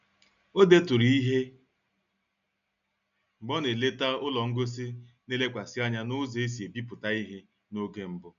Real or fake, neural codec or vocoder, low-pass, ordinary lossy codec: real; none; 7.2 kHz; none